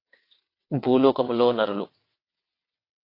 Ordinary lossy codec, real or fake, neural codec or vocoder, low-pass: AAC, 32 kbps; fake; vocoder, 22.05 kHz, 80 mel bands, WaveNeXt; 5.4 kHz